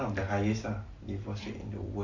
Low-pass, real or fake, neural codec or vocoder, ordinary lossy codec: 7.2 kHz; real; none; Opus, 64 kbps